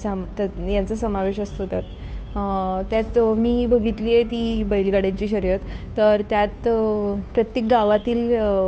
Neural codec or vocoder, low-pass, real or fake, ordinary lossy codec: codec, 16 kHz, 2 kbps, FunCodec, trained on Chinese and English, 25 frames a second; none; fake; none